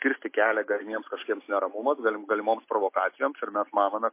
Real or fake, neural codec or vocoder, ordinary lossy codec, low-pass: real; none; MP3, 24 kbps; 3.6 kHz